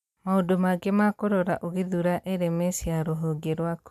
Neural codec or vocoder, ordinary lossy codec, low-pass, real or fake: none; MP3, 96 kbps; 14.4 kHz; real